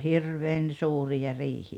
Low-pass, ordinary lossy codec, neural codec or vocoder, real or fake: 19.8 kHz; none; none; real